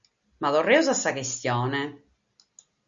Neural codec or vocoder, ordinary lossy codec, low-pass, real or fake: none; Opus, 64 kbps; 7.2 kHz; real